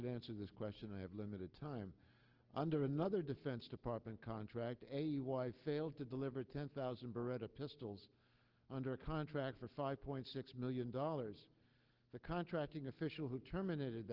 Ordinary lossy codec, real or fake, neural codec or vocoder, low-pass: Opus, 16 kbps; real; none; 5.4 kHz